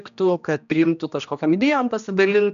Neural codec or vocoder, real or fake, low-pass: codec, 16 kHz, 1 kbps, X-Codec, HuBERT features, trained on general audio; fake; 7.2 kHz